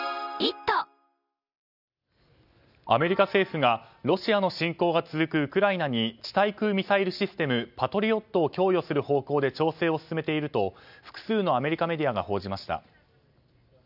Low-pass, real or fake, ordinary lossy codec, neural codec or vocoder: 5.4 kHz; real; none; none